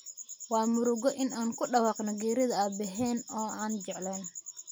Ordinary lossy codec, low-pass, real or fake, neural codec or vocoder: none; none; real; none